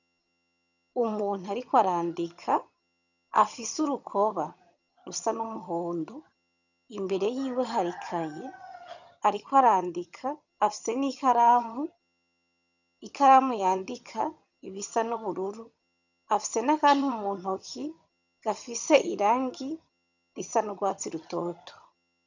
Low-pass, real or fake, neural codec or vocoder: 7.2 kHz; fake; vocoder, 22.05 kHz, 80 mel bands, HiFi-GAN